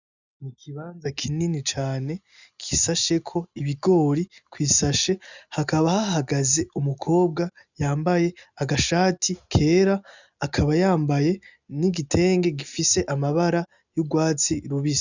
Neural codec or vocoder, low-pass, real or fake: none; 7.2 kHz; real